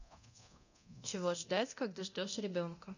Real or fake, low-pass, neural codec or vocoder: fake; 7.2 kHz; codec, 24 kHz, 0.9 kbps, DualCodec